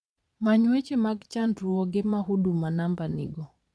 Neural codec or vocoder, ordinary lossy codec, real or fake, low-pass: vocoder, 22.05 kHz, 80 mel bands, Vocos; none; fake; none